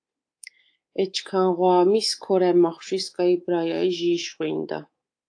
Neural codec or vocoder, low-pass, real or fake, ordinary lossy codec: codec, 24 kHz, 3.1 kbps, DualCodec; 9.9 kHz; fake; AAC, 48 kbps